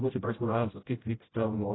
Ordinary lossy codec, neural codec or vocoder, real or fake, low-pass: AAC, 16 kbps; codec, 16 kHz, 0.5 kbps, FreqCodec, smaller model; fake; 7.2 kHz